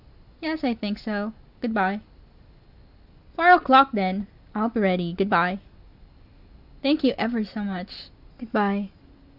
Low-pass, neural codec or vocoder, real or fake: 5.4 kHz; none; real